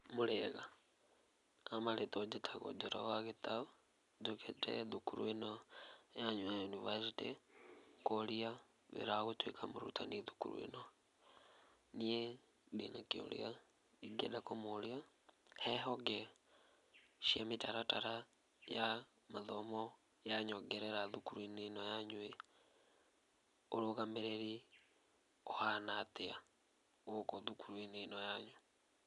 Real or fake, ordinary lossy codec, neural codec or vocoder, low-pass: real; none; none; 10.8 kHz